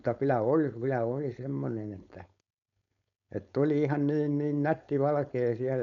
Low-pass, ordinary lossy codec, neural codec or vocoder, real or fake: 7.2 kHz; MP3, 64 kbps; codec, 16 kHz, 4.8 kbps, FACodec; fake